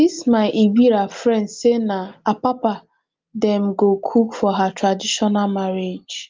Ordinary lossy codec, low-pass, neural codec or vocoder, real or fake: Opus, 32 kbps; 7.2 kHz; none; real